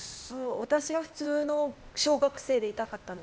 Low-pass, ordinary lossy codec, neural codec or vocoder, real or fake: none; none; codec, 16 kHz, 0.8 kbps, ZipCodec; fake